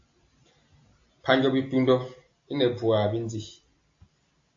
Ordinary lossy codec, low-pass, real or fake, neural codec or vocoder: AAC, 48 kbps; 7.2 kHz; real; none